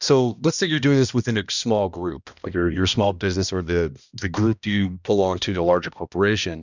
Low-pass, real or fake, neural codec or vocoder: 7.2 kHz; fake; codec, 16 kHz, 1 kbps, X-Codec, HuBERT features, trained on balanced general audio